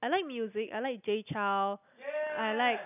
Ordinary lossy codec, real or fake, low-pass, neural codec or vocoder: none; real; 3.6 kHz; none